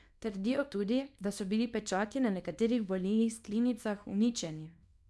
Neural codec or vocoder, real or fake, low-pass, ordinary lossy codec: codec, 24 kHz, 0.9 kbps, WavTokenizer, small release; fake; none; none